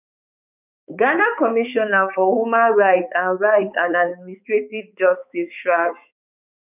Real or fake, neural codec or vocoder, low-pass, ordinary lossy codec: fake; codec, 16 kHz, 4 kbps, X-Codec, HuBERT features, trained on balanced general audio; 3.6 kHz; none